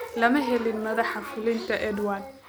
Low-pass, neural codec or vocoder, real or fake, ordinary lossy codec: none; none; real; none